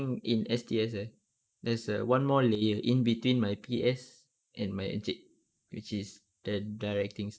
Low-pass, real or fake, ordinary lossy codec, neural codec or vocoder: none; real; none; none